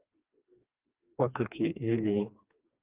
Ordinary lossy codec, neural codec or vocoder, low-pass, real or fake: Opus, 32 kbps; codec, 16 kHz, 2 kbps, FreqCodec, smaller model; 3.6 kHz; fake